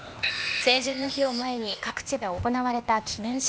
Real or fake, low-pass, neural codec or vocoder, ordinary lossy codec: fake; none; codec, 16 kHz, 0.8 kbps, ZipCodec; none